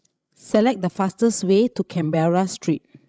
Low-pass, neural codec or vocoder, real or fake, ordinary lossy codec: none; codec, 16 kHz, 16 kbps, FreqCodec, larger model; fake; none